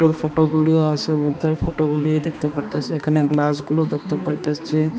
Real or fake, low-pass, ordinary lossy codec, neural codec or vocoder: fake; none; none; codec, 16 kHz, 2 kbps, X-Codec, HuBERT features, trained on balanced general audio